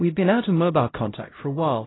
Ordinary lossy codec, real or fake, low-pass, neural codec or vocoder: AAC, 16 kbps; fake; 7.2 kHz; codec, 16 kHz, 0.5 kbps, X-Codec, HuBERT features, trained on LibriSpeech